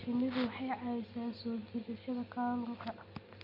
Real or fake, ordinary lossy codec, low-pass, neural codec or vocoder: real; MP3, 48 kbps; 5.4 kHz; none